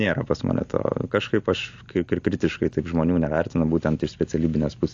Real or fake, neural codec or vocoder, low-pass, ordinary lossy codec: real; none; 7.2 kHz; AAC, 48 kbps